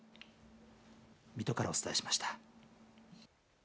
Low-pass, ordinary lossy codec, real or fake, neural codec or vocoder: none; none; real; none